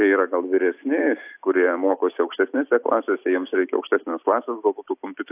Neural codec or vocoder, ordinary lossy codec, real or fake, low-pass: none; AAC, 32 kbps; real; 3.6 kHz